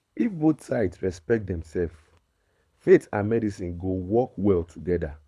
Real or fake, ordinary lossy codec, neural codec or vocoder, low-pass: fake; none; codec, 24 kHz, 6 kbps, HILCodec; none